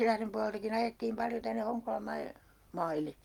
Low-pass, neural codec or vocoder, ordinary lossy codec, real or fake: 19.8 kHz; none; Opus, 32 kbps; real